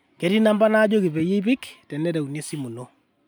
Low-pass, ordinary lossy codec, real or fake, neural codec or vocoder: none; none; real; none